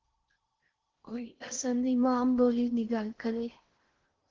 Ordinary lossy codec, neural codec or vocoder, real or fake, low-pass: Opus, 16 kbps; codec, 16 kHz in and 24 kHz out, 0.6 kbps, FocalCodec, streaming, 4096 codes; fake; 7.2 kHz